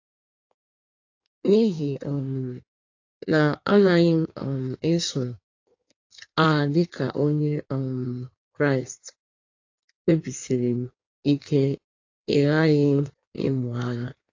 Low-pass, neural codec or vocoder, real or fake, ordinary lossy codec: 7.2 kHz; codec, 16 kHz in and 24 kHz out, 1.1 kbps, FireRedTTS-2 codec; fake; AAC, 48 kbps